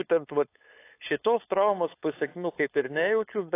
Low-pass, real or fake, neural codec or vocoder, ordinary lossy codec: 3.6 kHz; fake; codec, 16 kHz, 4.8 kbps, FACodec; AAC, 24 kbps